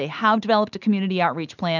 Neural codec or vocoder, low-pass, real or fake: none; 7.2 kHz; real